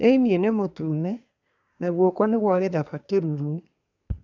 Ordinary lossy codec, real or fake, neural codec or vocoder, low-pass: none; fake; codec, 24 kHz, 1 kbps, SNAC; 7.2 kHz